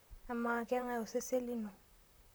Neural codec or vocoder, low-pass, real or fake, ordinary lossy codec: vocoder, 44.1 kHz, 128 mel bands, Pupu-Vocoder; none; fake; none